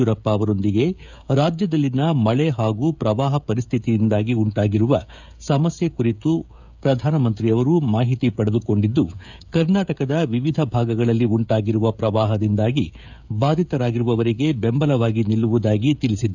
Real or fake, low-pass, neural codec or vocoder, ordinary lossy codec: fake; 7.2 kHz; codec, 16 kHz, 16 kbps, FreqCodec, smaller model; none